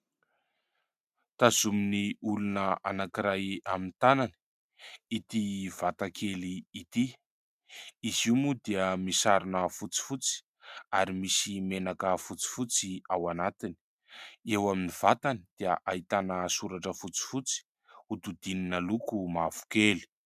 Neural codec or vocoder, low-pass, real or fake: none; 14.4 kHz; real